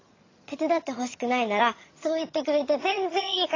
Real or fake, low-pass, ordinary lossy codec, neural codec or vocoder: fake; 7.2 kHz; AAC, 32 kbps; vocoder, 22.05 kHz, 80 mel bands, HiFi-GAN